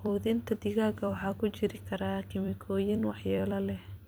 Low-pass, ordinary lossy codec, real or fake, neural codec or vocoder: none; none; fake; vocoder, 44.1 kHz, 128 mel bands every 256 samples, BigVGAN v2